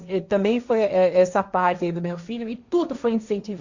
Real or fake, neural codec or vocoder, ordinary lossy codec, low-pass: fake; codec, 16 kHz, 1.1 kbps, Voila-Tokenizer; Opus, 64 kbps; 7.2 kHz